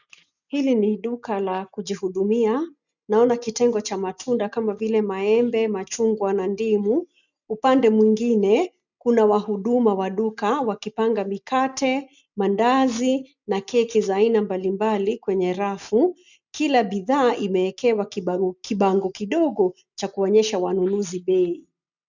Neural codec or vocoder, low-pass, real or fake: none; 7.2 kHz; real